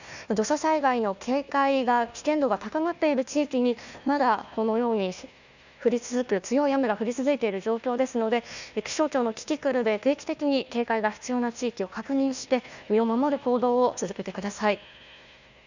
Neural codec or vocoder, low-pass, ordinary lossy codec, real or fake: codec, 16 kHz, 1 kbps, FunCodec, trained on Chinese and English, 50 frames a second; 7.2 kHz; none; fake